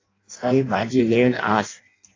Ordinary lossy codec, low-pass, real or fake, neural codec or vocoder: AAC, 32 kbps; 7.2 kHz; fake; codec, 16 kHz in and 24 kHz out, 0.6 kbps, FireRedTTS-2 codec